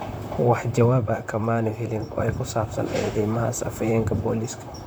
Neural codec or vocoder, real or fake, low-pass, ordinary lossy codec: vocoder, 44.1 kHz, 128 mel bands, Pupu-Vocoder; fake; none; none